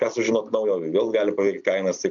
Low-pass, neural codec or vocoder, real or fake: 7.2 kHz; none; real